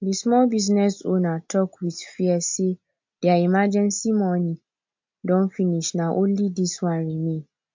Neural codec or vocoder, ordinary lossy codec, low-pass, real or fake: none; MP3, 48 kbps; 7.2 kHz; real